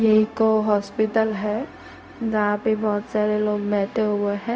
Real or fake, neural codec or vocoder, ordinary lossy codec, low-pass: fake; codec, 16 kHz, 0.4 kbps, LongCat-Audio-Codec; none; none